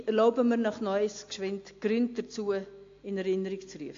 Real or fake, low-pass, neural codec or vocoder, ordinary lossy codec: real; 7.2 kHz; none; AAC, 48 kbps